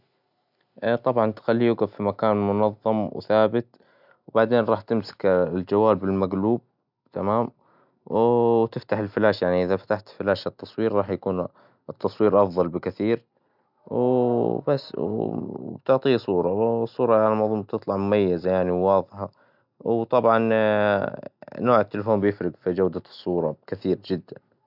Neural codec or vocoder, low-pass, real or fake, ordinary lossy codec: none; 5.4 kHz; real; none